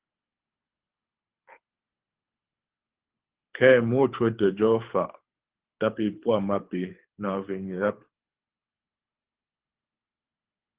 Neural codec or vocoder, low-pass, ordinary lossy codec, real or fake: codec, 24 kHz, 6 kbps, HILCodec; 3.6 kHz; Opus, 16 kbps; fake